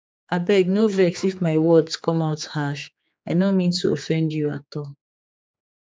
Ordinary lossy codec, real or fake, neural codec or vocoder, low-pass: none; fake; codec, 16 kHz, 4 kbps, X-Codec, HuBERT features, trained on general audio; none